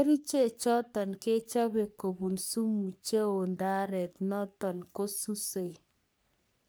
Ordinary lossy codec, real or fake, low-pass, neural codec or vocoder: none; fake; none; codec, 44.1 kHz, 3.4 kbps, Pupu-Codec